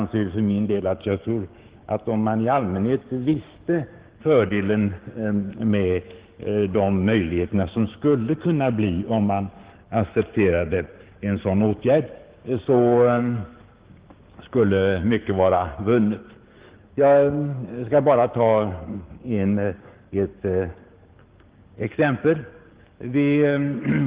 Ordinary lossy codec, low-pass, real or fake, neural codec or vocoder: Opus, 16 kbps; 3.6 kHz; fake; codec, 16 kHz, 6 kbps, DAC